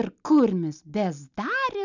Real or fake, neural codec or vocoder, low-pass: real; none; 7.2 kHz